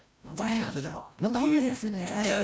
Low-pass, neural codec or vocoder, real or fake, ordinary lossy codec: none; codec, 16 kHz, 0.5 kbps, FreqCodec, larger model; fake; none